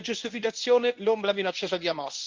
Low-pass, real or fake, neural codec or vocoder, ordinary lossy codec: 7.2 kHz; fake; codec, 24 kHz, 0.9 kbps, WavTokenizer, medium speech release version 1; Opus, 24 kbps